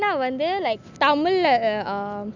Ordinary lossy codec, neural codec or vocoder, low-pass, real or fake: none; none; 7.2 kHz; real